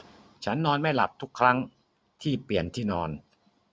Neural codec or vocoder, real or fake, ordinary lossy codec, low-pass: none; real; none; none